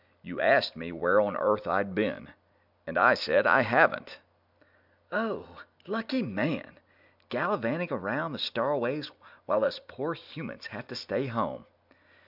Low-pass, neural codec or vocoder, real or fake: 5.4 kHz; none; real